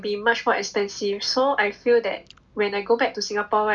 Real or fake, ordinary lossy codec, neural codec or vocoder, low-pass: real; none; none; none